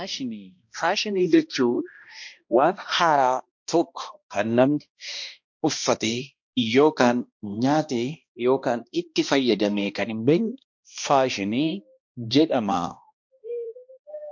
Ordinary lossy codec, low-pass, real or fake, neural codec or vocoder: MP3, 48 kbps; 7.2 kHz; fake; codec, 16 kHz, 1 kbps, X-Codec, HuBERT features, trained on balanced general audio